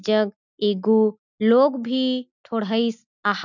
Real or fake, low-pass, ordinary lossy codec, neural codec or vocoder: real; 7.2 kHz; none; none